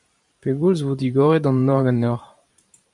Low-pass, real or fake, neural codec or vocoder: 10.8 kHz; fake; vocoder, 44.1 kHz, 128 mel bands every 512 samples, BigVGAN v2